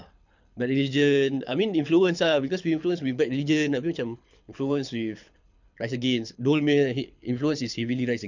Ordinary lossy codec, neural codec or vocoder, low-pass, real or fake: none; codec, 24 kHz, 6 kbps, HILCodec; 7.2 kHz; fake